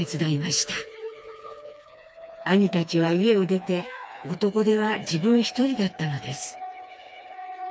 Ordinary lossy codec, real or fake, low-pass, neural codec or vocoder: none; fake; none; codec, 16 kHz, 2 kbps, FreqCodec, smaller model